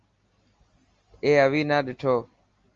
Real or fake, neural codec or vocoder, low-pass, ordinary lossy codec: real; none; 7.2 kHz; Opus, 32 kbps